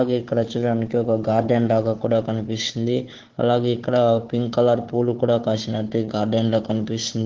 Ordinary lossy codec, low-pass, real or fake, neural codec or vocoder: Opus, 16 kbps; 7.2 kHz; fake; codec, 16 kHz, 4 kbps, FunCodec, trained on Chinese and English, 50 frames a second